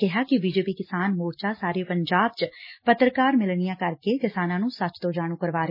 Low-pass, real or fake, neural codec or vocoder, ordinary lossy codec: 5.4 kHz; real; none; MP3, 24 kbps